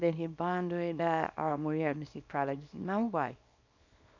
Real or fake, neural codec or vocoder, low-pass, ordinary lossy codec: fake; codec, 24 kHz, 0.9 kbps, WavTokenizer, small release; 7.2 kHz; none